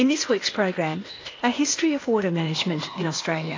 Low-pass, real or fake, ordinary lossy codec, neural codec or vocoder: 7.2 kHz; fake; AAC, 32 kbps; codec, 16 kHz, 0.8 kbps, ZipCodec